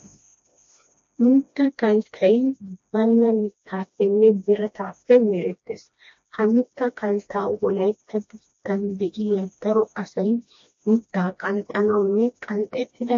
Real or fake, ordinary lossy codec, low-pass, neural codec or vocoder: fake; MP3, 48 kbps; 7.2 kHz; codec, 16 kHz, 1 kbps, FreqCodec, smaller model